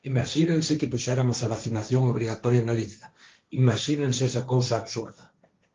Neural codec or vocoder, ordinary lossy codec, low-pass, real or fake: codec, 16 kHz, 1.1 kbps, Voila-Tokenizer; Opus, 32 kbps; 7.2 kHz; fake